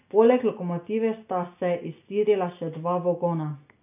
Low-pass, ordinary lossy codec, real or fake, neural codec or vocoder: 3.6 kHz; none; real; none